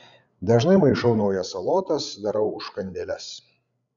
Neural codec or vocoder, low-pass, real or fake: codec, 16 kHz, 8 kbps, FreqCodec, larger model; 7.2 kHz; fake